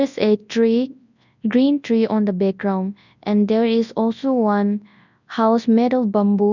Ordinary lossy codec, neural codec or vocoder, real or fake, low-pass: none; codec, 24 kHz, 0.9 kbps, WavTokenizer, large speech release; fake; 7.2 kHz